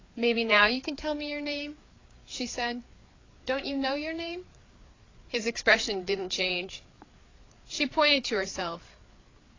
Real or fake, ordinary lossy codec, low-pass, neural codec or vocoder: fake; AAC, 32 kbps; 7.2 kHz; codec, 16 kHz, 4 kbps, FreqCodec, larger model